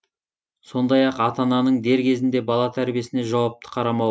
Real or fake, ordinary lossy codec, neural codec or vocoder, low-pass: real; none; none; none